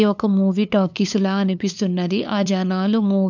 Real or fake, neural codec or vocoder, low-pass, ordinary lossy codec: fake; codec, 16 kHz, 2 kbps, FunCodec, trained on LibriTTS, 25 frames a second; 7.2 kHz; none